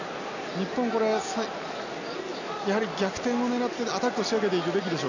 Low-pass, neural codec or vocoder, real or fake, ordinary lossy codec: 7.2 kHz; none; real; none